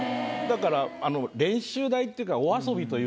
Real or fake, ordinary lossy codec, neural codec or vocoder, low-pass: real; none; none; none